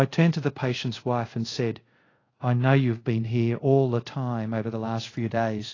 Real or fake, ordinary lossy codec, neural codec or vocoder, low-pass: fake; AAC, 32 kbps; codec, 24 kHz, 0.5 kbps, DualCodec; 7.2 kHz